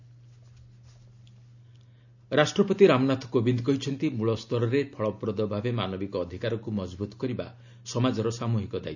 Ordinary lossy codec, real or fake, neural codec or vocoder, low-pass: none; real; none; 7.2 kHz